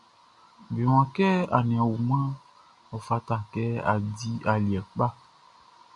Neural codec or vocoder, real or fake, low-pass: none; real; 10.8 kHz